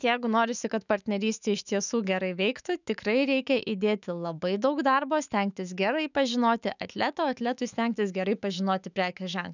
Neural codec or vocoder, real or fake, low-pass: autoencoder, 48 kHz, 128 numbers a frame, DAC-VAE, trained on Japanese speech; fake; 7.2 kHz